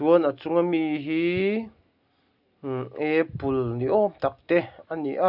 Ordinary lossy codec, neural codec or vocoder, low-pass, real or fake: none; none; 5.4 kHz; real